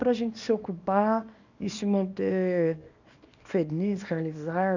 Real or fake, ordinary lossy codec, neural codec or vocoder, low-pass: fake; none; codec, 24 kHz, 0.9 kbps, WavTokenizer, small release; 7.2 kHz